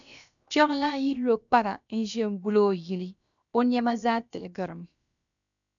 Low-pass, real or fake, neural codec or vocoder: 7.2 kHz; fake; codec, 16 kHz, about 1 kbps, DyCAST, with the encoder's durations